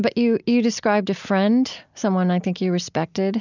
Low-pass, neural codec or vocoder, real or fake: 7.2 kHz; none; real